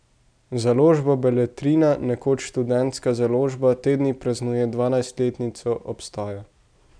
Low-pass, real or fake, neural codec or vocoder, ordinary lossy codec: 9.9 kHz; real; none; none